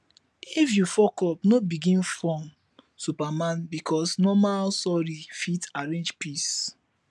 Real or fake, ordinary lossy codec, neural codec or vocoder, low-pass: real; none; none; none